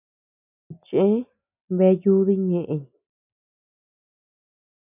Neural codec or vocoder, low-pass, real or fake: none; 3.6 kHz; real